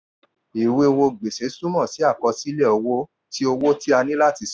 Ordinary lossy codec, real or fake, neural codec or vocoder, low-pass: none; real; none; none